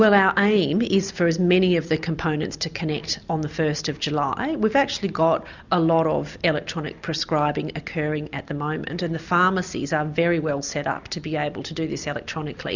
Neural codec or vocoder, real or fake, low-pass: none; real; 7.2 kHz